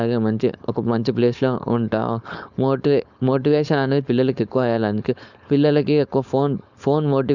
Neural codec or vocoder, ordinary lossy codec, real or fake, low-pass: codec, 16 kHz, 4.8 kbps, FACodec; none; fake; 7.2 kHz